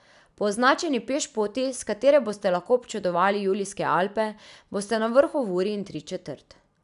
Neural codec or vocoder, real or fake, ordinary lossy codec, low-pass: none; real; none; 10.8 kHz